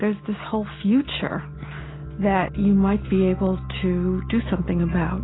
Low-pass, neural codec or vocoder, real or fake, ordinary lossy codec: 7.2 kHz; none; real; AAC, 16 kbps